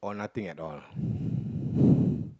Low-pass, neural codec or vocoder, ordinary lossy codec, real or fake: none; none; none; real